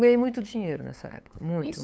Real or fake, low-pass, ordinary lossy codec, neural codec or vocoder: fake; none; none; codec, 16 kHz, 8 kbps, FunCodec, trained on LibriTTS, 25 frames a second